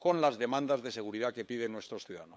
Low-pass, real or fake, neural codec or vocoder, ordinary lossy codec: none; fake; codec, 16 kHz, 8 kbps, FunCodec, trained on LibriTTS, 25 frames a second; none